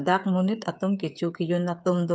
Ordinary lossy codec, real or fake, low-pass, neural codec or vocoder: none; fake; none; codec, 16 kHz, 8 kbps, FreqCodec, smaller model